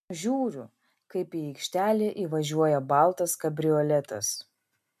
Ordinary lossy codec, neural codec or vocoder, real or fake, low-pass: MP3, 96 kbps; none; real; 14.4 kHz